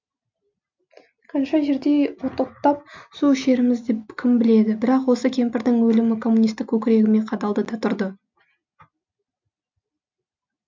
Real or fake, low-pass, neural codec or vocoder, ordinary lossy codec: real; 7.2 kHz; none; MP3, 64 kbps